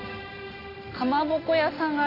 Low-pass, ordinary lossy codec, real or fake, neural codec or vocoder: 5.4 kHz; none; real; none